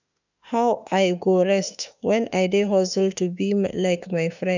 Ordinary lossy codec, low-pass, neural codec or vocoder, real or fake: none; 7.2 kHz; autoencoder, 48 kHz, 32 numbers a frame, DAC-VAE, trained on Japanese speech; fake